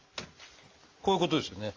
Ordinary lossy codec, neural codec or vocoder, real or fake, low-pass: Opus, 32 kbps; none; real; 7.2 kHz